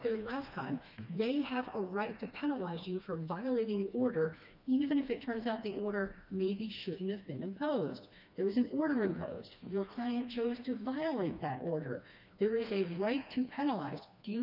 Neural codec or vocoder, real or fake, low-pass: codec, 16 kHz, 2 kbps, FreqCodec, smaller model; fake; 5.4 kHz